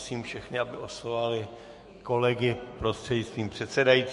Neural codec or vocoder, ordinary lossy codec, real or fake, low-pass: autoencoder, 48 kHz, 128 numbers a frame, DAC-VAE, trained on Japanese speech; MP3, 48 kbps; fake; 14.4 kHz